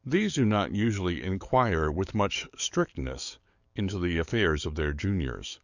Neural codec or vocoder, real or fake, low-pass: codec, 44.1 kHz, 7.8 kbps, DAC; fake; 7.2 kHz